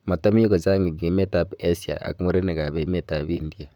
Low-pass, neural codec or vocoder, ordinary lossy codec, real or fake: 19.8 kHz; vocoder, 44.1 kHz, 128 mel bands, Pupu-Vocoder; none; fake